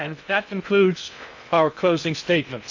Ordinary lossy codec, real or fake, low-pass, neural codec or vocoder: MP3, 64 kbps; fake; 7.2 kHz; codec, 16 kHz in and 24 kHz out, 0.6 kbps, FocalCodec, streaming, 2048 codes